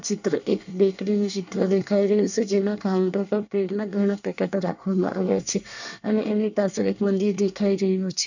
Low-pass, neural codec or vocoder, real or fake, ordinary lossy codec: 7.2 kHz; codec, 24 kHz, 1 kbps, SNAC; fake; none